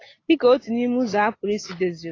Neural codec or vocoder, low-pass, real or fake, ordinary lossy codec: none; 7.2 kHz; real; AAC, 32 kbps